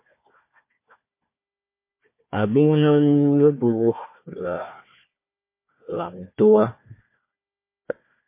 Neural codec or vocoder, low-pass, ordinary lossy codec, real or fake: codec, 16 kHz, 1 kbps, FunCodec, trained on Chinese and English, 50 frames a second; 3.6 kHz; MP3, 24 kbps; fake